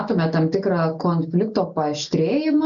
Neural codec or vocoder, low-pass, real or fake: none; 7.2 kHz; real